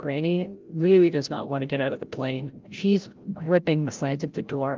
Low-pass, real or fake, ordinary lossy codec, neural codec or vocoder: 7.2 kHz; fake; Opus, 32 kbps; codec, 16 kHz, 0.5 kbps, FreqCodec, larger model